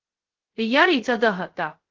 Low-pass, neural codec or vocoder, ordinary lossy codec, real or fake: 7.2 kHz; codec, 16 kHz, 0.2 kbps, FocalCodec; Opus, 16 kbps; fake